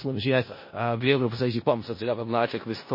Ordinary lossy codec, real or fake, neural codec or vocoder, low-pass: MP3, 24 kbps; fake; codec, 16 kHz in and 24 kHz out, 0.4 kbps, LongCat-Audio-Codec, four codebook decoder; 5.4 kHz